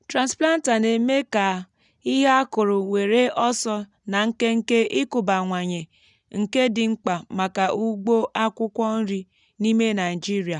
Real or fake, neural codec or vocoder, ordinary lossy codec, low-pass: real; none; none; 10.8 kHz